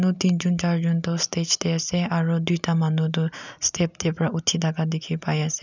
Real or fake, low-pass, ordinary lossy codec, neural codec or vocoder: real; 7.2 kHz; none; none